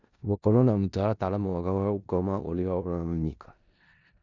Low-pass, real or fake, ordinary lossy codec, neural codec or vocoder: 7.2 kHz; fake; none; codec, 16 kHz in and 24 kHz out, 0.4 kbps, LongCat-Audio-Codec, four codebook decoder